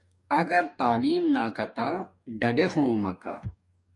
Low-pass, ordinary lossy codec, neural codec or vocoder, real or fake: 10.8 kHz; MP3, 96 kbps; codec, 44.1 kHz, 2.6 kbps, DAC; fake